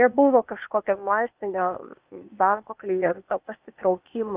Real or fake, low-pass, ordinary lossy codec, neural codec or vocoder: fake; 3.6 kHz; Opus, 24 kbps; codec, 16 kHz, 0.8 kbps, ZipCodec